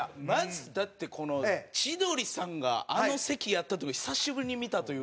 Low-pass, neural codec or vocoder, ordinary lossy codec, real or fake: none; none; none; real